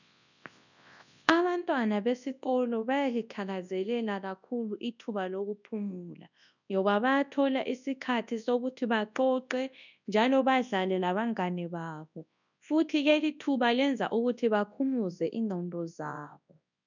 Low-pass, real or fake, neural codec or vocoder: 7.2 kHz; fake; codec, 24 kHz, 0.9 kbps, WavTokenizer, large speech release